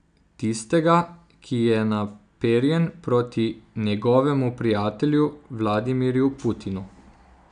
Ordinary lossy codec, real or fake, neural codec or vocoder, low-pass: none; real; none; 9.9 kHz